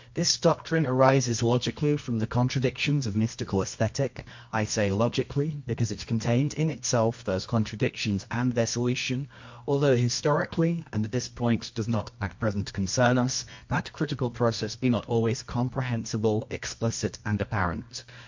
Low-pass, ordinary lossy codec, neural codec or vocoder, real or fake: 7.2 kHz; MP3, 48 kbps; codec, 24 kHz, 0.9 kbps, WavTokenizer, medium music audio release; fake